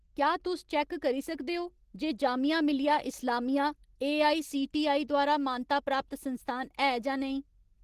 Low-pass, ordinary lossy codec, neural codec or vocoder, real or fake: 14.4 kHz; Opus, 16 kbps; none; real